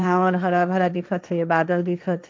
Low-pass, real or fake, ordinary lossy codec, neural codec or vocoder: none; fake; none; codec, 16 kHz, 1.1 kbps, Voila-Tokenizer